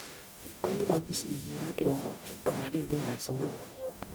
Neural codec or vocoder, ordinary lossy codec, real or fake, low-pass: codec, 44.1 kHz, 0.9 kbps, DAC; none; fake; none